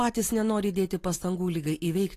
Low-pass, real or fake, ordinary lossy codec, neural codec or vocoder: 14.4 kHz; real; AAC, 48 kbps; none